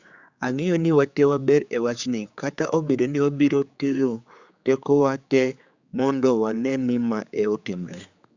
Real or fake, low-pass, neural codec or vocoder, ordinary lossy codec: fake; 7.2 kHz; codec, 16 kHz, 4 kbps, X-Codec, HuBERT features, trained on general audio; Opus, 64 kbps